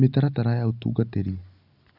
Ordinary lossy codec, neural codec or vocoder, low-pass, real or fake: none; none; 5.4 kHz; real